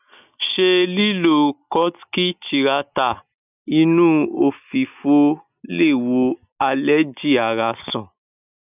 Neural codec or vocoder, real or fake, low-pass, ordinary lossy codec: none; real; 3.6 kHz; none